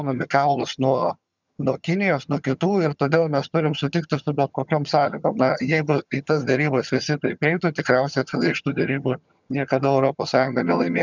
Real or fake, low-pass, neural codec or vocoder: fake; 7.2 kHz; vocoder, 22.05 kHz, 80 mel bands, HiFi-GAN